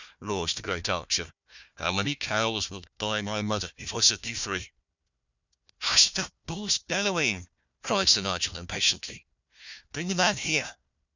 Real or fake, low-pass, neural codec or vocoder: fake; 7.2 kHz; codec, 16 kHz, 1 kbps, FunCodec, trained on Chinese and English, 50 frames a second